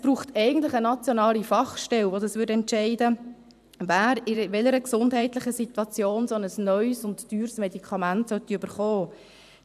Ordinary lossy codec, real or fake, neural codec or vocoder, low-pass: none; fake; vocoder, 44.1 kHz, 128 mel bands every 512 samples, BigVGAN v2; 14.4 kHz